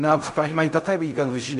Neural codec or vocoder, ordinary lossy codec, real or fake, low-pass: codec, 16 kHz in and 24 kHz out, 0.4 kbps, LongCat-Audio-Codec, fine tuned four codebook decoder; MP3, 96 kbps; fake; 10.8 kHz